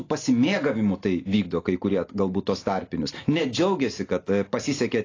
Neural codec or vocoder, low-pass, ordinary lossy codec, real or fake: none; 7.2 kHz; AAC, 32 kbps; real